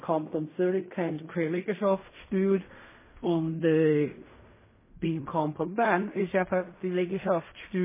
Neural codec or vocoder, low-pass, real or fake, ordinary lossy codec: codec, 16 kHz in and 24 kHz out, 0.4 kbps, LongCat-Audio-Codec, fine tuned four codebook decoder; 3.6 kHz; fake; MP3, 16 kbps